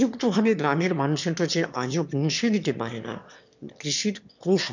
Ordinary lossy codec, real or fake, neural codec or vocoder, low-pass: none; fake; autoencoder, 22.05 kHz, a latent of 192 numbers a frame, VITS, trained on one speaker; 7.2 kHz